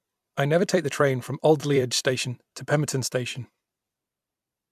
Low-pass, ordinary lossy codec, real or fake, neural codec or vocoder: 14.4 kHz; MP3, 96 kbps; fake; vocoder, 44.1 kHz, 128 mel bands every 256 samples, BigVGAN v2